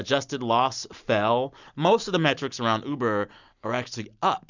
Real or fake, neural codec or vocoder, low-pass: real; none; 7.2 kHz